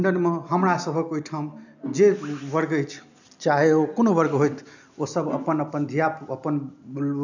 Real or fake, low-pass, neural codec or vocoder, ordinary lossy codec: real; 7.2 kHz; none; none